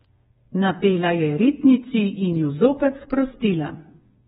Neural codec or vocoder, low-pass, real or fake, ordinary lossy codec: codec, 16 kHz, 4 kbps, FreqCodec, smaller model; 7.2 kHz; fake; AAC, 16 kbps